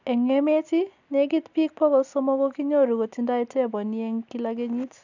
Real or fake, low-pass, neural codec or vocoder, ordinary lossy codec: real; 7.2 kHz; none; none